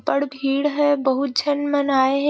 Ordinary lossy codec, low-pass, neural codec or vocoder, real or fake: none; none; none; real